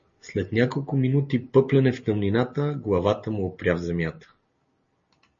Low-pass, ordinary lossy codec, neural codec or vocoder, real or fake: 7.2 kHz; MP3, 32 kbps; none; real